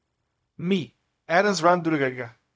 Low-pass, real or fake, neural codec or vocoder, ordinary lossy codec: none; fake; codec, 16 kHz, 0.4 kbps, LongCat-Audio-Codec; none